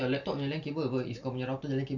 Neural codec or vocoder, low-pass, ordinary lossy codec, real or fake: none; 7.2 kHz; none; real